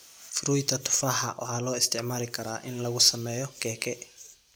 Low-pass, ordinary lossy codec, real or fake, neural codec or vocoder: none; none; real; none